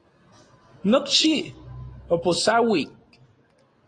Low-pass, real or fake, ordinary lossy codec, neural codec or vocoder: 9.9 kHz; real; AAC, 32 kbps; none